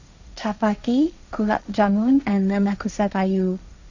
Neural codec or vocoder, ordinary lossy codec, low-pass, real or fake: codec, 16 kHz, 1.1 kbps, Voila-Tokenizer; none; 7.2 kHz; fake